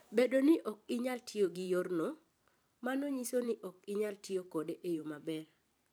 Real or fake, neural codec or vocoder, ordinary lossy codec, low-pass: fake; vocoder, 44.1 kHz, 128 mel bands every 512 samples, BigVGAN v2; none; none